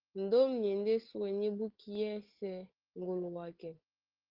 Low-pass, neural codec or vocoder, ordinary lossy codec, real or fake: 5.4 kHz; none; Opus, 16 kbps; real